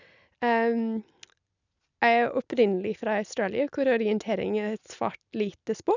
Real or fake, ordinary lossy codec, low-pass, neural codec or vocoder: real; none; 7.2 kHz; none